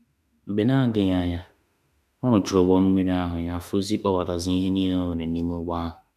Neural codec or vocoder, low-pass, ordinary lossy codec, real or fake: autoencoder, 48 kHz, 32 numbers a frame, DAC-VAE, trained on Japanese speech; 14.4 kHz; none; fake